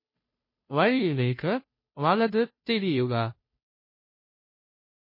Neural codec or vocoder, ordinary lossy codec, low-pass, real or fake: codec, 16 kHz, 0.5 kbps, FunCodec, trained on Chinese and English, 25 frames a second; MP3, 24 kbps; 5.4 kHz; fake